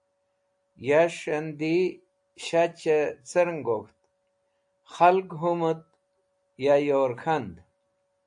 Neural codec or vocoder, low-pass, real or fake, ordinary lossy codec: none; 9.9 kHz; real; AAC, 64 kbps